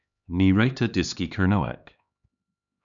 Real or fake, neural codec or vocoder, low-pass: fake; codec, 16 kHz, 2 kbps, X-Codec, HuBERT features, trained on LibriSpeech; 7.2 kHz